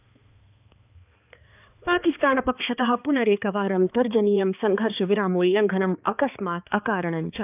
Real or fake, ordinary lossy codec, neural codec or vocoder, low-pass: fake; none; codec, 16 kHz, 2 kbps, X-Codec, HuBERT features, trained on balanced general audio; 3.6 kHz